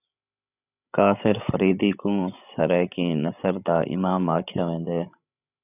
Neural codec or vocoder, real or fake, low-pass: codec, 16 kHz, 16 kbps, FreqCodec, larger model; fake; 3.6 kHz